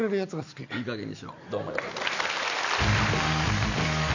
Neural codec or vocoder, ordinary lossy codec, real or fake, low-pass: none; none; real; 7.2 kHz